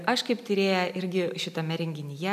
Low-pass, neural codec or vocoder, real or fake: 14.4 kHz; none; real